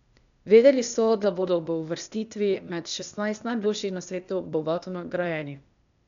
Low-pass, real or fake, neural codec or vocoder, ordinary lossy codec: 7.2 kHz; fake; codec, 16 kHz, 0.8 kbps, ZipCodec; none